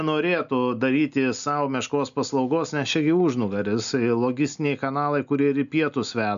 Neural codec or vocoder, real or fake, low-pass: none; real; 7.2 kHz